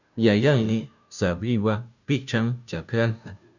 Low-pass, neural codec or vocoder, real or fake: 7.2 kHz; codec, 16 kHz, 0.5 kbps, FunCodec, trained on Chinese and English, 25 frames a second; fake